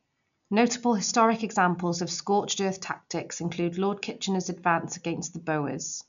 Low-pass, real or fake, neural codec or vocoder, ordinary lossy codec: 7.2 kHz; real; none; none